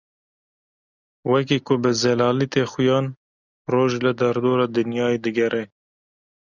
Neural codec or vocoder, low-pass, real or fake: none; 7.2 kHz; real